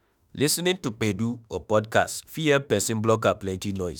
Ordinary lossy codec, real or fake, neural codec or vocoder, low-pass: none; fake; autoencoder, 48 kHz, 32 numbers a frame, DAC-VAE, trained on Japanese speech; none